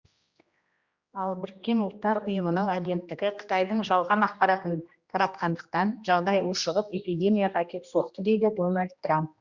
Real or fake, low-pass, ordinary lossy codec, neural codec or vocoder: fake; 7.2 kHz; Opus, 64 kbps; codec, 16 kHz, 1 kbps, X-Codec, HuBERT features, trained on general audio